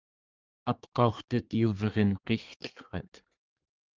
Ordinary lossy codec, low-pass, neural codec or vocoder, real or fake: Opus, 32 kbps; 7.2 kHz; codec, 24 kHz, 1 kbps, SNAC; fake